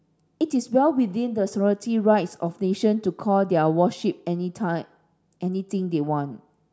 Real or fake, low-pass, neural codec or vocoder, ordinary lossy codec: real; none; none; none